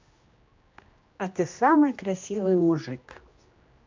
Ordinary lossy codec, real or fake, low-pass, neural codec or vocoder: MP3, 48 kbps; fake; 7.2 kHz; codec, 16 kHz, 1 kbps, X-Codec, HuBERT features, trained on general audio